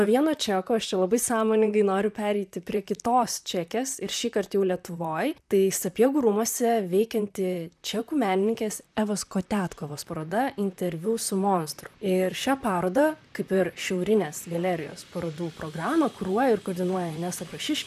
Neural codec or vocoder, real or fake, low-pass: vocoder, 44.1 kHz, 128 mel bands, Pupu-Vocoder; fake; 14.4 kHz